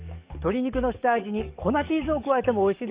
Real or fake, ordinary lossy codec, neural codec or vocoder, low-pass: fake; Opus, 64 kbps; codec, 44.1 kHz, 7.8 kbps, DAC; 3.6 kHz